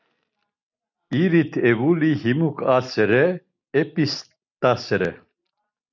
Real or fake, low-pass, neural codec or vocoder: real; 7.2 kHz; none